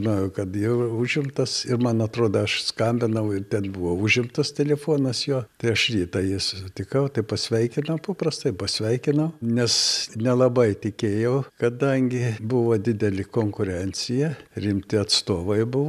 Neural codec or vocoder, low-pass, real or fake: none; 14.4 kHz; real